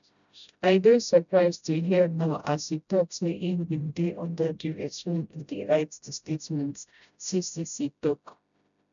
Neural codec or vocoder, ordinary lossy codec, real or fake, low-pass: codec, 16 kHz, 0.5 kbps, FreqCodec, smaller model; none; fake; 7.2 kHz